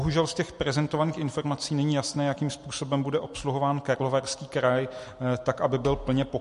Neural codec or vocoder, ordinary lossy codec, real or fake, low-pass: none; MP3, 48 kbps; real; 14.4 kHz